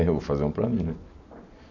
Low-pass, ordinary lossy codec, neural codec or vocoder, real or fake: 7.2 kHz; none; none; real